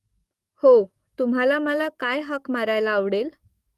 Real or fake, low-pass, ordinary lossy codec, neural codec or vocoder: fake; 14.4 kHz; Opus, 32 kbps; codec, 44.1 kHz, 7.8 kbps, DAC